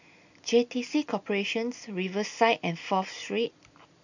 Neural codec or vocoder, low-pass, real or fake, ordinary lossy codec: none; 7.2 kHz; real; none